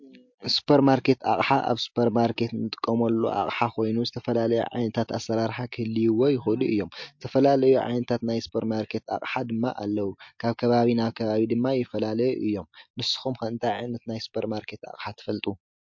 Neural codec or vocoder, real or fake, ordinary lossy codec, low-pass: none; real; MP3, 48 kbps; 7.2 kHz